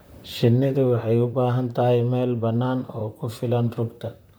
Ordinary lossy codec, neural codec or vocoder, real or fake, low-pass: none; vocoder, 44.1 kHz, 128 mel bands, Pupu-Vocoder; fake; none